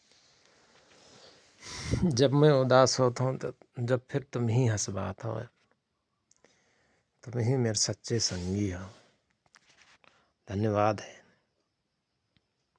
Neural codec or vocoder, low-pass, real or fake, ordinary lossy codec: none; none; real; none